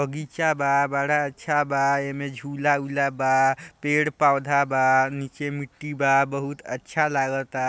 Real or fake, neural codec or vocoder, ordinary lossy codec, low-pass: real; none; none; none